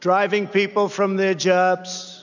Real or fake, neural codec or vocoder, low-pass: real; none; 7.2 kHz